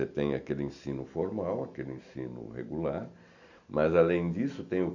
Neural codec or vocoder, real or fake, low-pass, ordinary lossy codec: none; real; 7.2 kHz; none